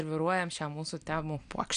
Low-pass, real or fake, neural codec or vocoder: 9.9 kHz; real; none